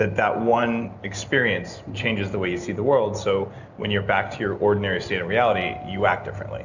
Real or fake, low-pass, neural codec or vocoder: real; 7.2 kHz; none